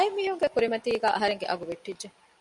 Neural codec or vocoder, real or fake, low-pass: none; real; 10.8 kHz